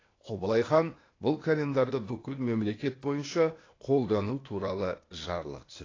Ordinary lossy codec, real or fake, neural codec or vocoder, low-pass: AAC, 32 kbps; fake; codec, 16 kHz, 0.8 kbps, ZipCodec; 7.2 kHz